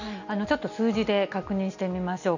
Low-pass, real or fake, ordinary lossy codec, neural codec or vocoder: 7.2 kHz; real; none; none